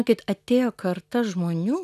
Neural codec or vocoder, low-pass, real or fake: none; 14.4 kHz; real